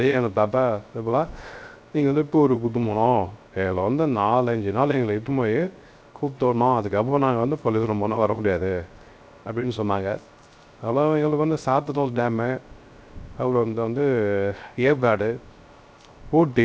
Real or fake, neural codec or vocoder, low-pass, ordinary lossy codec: fake; codec, 16 kHz, 0.3 kbps, FocalCodec; none; none